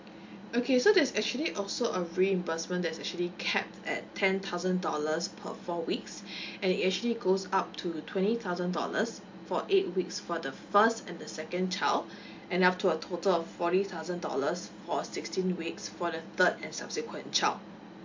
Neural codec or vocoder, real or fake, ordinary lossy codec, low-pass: none; real; MP3, 48 kbps; 7.2 kHz